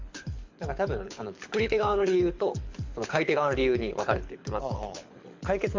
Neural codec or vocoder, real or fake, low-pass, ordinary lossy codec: codec, 24 kHz, 6 kbps, HILCodec; fake; 7.2 kHz; MP3, 48 kbps